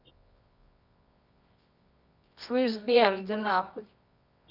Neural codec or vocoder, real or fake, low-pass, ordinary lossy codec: codec, 24 kHz, 0.9 kbps, WavTokenizer, medium music audio release; fake; 5.4 kHz; none